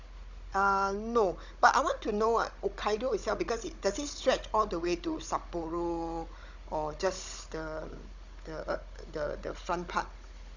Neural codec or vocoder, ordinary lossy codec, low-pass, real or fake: codec, 16 kHz, 16 kbps, FunCodec, trained on Chinese and English, 50 frames a second; none; 7.2 kHz; fake